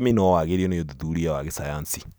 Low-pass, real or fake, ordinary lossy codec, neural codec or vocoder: none; real; none; none